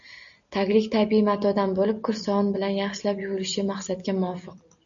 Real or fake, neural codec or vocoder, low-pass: real; none; 7.2 kHz